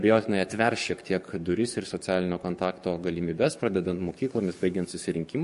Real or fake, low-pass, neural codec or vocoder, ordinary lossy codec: fake; 14.4 kHz; codec, 44.1 kHz, 7.8 kbps, DAC; MP3, 48 kbps